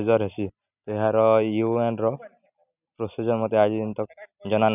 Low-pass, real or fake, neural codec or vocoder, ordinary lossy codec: 3.6 kHz; real; none; none